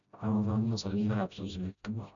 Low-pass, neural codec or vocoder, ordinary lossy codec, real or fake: 7.2 kHz; codec, 16 kHz, 0.5 kbps, FreqCodec, smaller model; none; fake